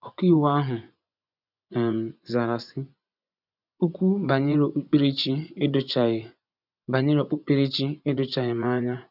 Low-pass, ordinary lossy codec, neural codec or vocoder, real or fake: 5.4 kHz; none; vocoder, 24 kHz, 100 mel bands, Vocos; fake